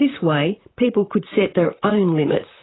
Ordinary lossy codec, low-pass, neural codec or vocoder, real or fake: AAC, 16 kbps; 7.2 kHz; vocoder, 44.1 kHz, 128 mel bands, Pupu-Vocoder; fake